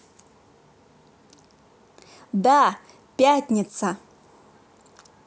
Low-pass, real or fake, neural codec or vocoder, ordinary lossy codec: none; real; none; none